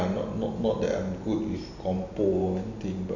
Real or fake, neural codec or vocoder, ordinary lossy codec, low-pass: real; none; none; 7.2 kHz